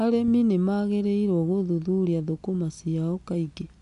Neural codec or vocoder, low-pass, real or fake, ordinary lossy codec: none; 10.8 kHz; real; none